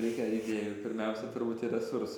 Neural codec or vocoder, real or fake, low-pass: none; real; 19.8 kHz